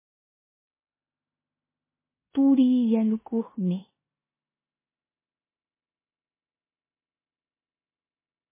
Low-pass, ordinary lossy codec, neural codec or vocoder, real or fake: 3.6 kHz; MP3, 16 kbps; codec, 16 kHz in and 24 kHz out, 0.9 kbps, LongCat-Audio-Codec, four codebook decoder; fake